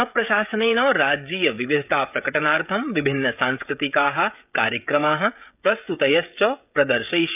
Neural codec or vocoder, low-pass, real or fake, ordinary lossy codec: codec, 16 kHz, 16 kbps, FreqCodec, smaller model; 3.6 kHz; fake; none